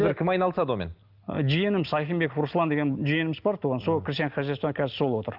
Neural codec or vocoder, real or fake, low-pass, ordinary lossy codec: none; real; 5.4 kHz; Opus, 24 kbps